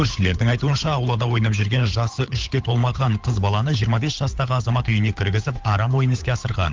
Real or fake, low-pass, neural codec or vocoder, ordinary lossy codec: fake; 7.2 kHz; codec, 16 kHz, 8 kbps, FunCodec, trained on Chinese and English, 25 frames a second; Opus, 32 kbps